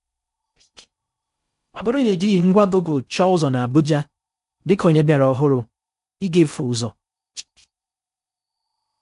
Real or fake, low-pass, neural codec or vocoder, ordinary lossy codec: fake; 10.8 kHz; codec, 16 kHz in and 24 kHz out, 0.6 kbps, FocalCodec, streaming, 4096 codes; AAC, 96 kbps